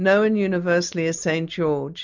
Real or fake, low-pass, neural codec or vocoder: real; 7.2 kHz; none